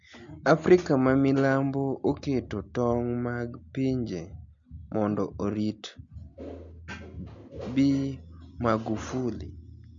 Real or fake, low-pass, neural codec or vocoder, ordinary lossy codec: real; 7.2 kHz; none; MP3, 48 kbps